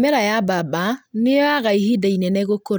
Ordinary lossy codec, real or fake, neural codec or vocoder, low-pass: none; real; none; none